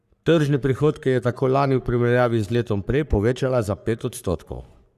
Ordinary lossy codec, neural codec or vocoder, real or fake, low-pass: none; codec, 44.1 kHz, 3.4 kbps, Pupu-Codec; fake; 14.4 kHz